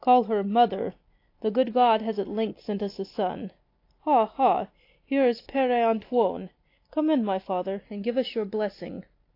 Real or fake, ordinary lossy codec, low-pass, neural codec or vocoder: real; AAC, 32 kbps; 5.4 kHz; none